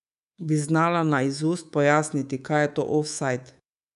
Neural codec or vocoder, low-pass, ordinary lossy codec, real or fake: codec, 24 kHz, 3.1 kbps, DualCodec; 10.8 kHz; none; fake